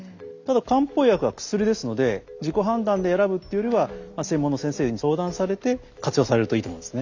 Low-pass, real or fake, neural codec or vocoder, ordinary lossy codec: 7.2 kHz; real; none; Opus, 64 kbps